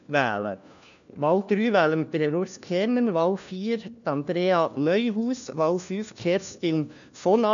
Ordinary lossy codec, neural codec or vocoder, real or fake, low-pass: MP3, 96 kbps; codec, 16 kHz, 1 kbps, FunCodec, trained on LibriTTS, 50 frames a second; fake; 7.2 kHz